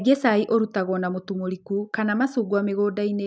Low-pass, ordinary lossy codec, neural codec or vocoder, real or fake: none; none; none; real